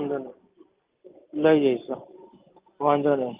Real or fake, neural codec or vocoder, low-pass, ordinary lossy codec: real; none; 3.6 kHz; Opus, 24 kbps